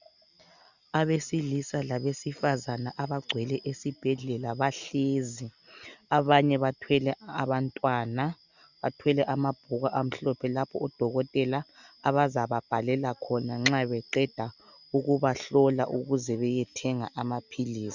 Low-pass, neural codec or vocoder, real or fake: 7.2 kHz; none; real